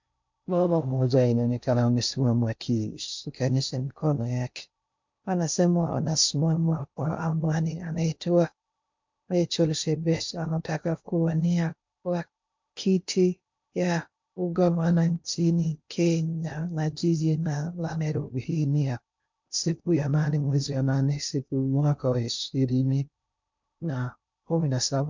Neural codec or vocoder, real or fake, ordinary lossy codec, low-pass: codec, 16 kHz in and 24 kHz out, 0.6 kbps, FocalCodec, streaming, 4096 codes; fake; MP3, 64 kbps; 7.2 kHz